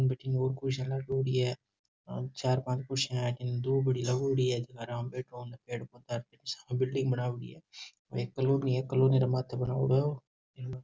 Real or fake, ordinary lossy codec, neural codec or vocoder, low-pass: real; none; none; none